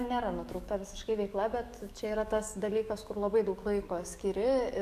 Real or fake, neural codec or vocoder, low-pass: fake; codec, 44.1 kHz, 7.8 kbps, DAC; 14.4 kHz